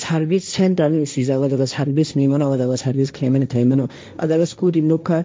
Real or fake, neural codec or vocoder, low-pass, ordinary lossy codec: fake; codec, 16 kHz, 1.1 kbps, Voila-Tokenizer; none; none